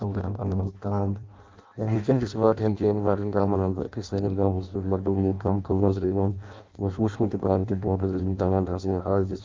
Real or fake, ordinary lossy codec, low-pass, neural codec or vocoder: fake; Opus, 32 kbps; 7.2 kHz; codec, 16 kHz in and 24 kHz out, 0.6 kbps, FireRedTTS-2 codec